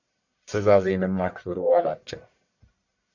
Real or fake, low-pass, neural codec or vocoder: fake; 7.2 kHz; codec, 44.1 kHz, 1.7 kbps, Pupu-Codec